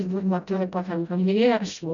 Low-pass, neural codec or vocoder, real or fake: 7.2 kHz; codec, 16 kHz, 0.5 kbps, FreqCodec, smaller model; fake